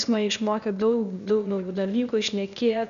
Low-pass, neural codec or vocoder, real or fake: 7.2 kHz; codec, 16 kHz, 0.8 kbps, ZipCodec; fake